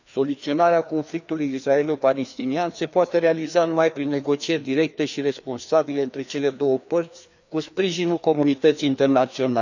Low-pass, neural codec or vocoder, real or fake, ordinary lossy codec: 7.2 kHz; codec, 16 kHz, 2 kbps, FreqCodec, larger model; fake; none